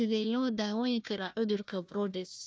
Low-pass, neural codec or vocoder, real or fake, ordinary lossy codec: none; codec, 16 kHz, 1 kbps, FunCodec, trained on Chinese and English, 50 frames a second; fake; none